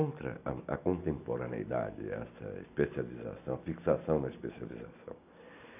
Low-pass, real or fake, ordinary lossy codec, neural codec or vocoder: 3.6 kHz; real; none; none